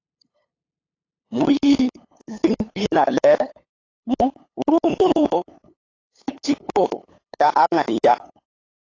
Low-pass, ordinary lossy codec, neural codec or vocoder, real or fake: 7.2 kHz; AAC, 32 kbps; codec, 16 kHz, 8 kbps, FunCodec, trained on LibriTTS, 25 frames a second; fake